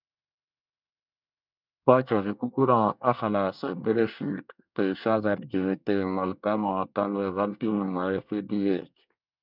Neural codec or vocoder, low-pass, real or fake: codec, 24 kHz, 1 kbps, SNAC; 5.4 kHz; fake